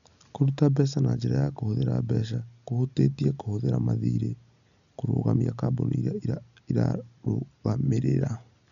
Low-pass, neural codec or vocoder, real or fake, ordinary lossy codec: 7.2 kHz; none; real; none